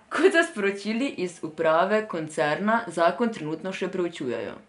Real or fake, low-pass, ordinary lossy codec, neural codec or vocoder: real; 10.8 kHz; none; none